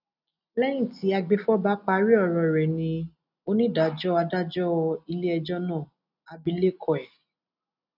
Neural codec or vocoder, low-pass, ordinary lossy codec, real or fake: none; 5.4 kHz; none; real